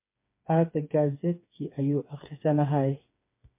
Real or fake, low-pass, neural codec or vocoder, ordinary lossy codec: fake; 3.6 kHz; codec, 16 kHz, 4 kbps, FreqCodec, smaller model; MP3, 24 kbps